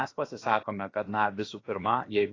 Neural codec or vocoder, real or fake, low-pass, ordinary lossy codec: codec, 16 kHz, about 1 kbps, DyCAST, with the encoder's durations; fake; 7.2 kHz; AAC, 32 kbps